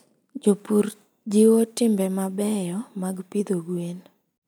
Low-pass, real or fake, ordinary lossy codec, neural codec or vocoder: none; real; none; none